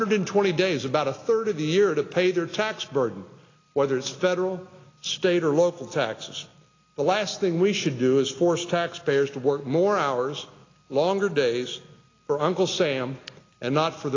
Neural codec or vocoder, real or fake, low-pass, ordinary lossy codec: none; real; 7.2 kHz; AAC, 32 kbps